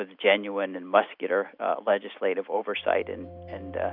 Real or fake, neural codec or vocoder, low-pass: real; none; 5.4 kHz